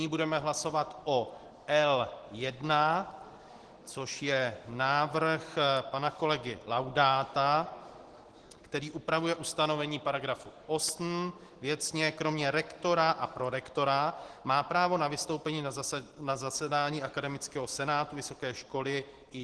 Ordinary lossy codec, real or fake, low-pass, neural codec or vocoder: Opus, 16 kbps; real; 10.8 kHz; none